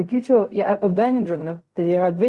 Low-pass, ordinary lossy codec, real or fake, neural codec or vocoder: 10.8 kHz; Opus, 64 kbps; fake; codec, 16 kHz in and 24 kHz out, 0.4 kbps, LongCat-Audio-Codec, fine tuned four codebook decoder